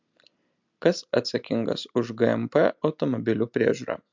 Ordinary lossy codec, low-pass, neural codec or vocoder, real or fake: MP3, 64 kbps; 7.2 kHz; none; real